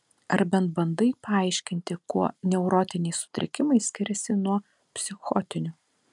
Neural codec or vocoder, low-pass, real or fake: none; 10.8 kHz; real